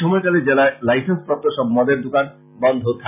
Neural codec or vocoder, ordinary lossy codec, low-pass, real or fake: none; none; 3.6 kHz; real